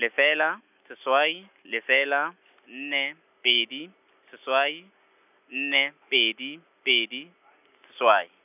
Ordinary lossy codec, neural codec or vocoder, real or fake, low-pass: none; none; real; 3.6 kHz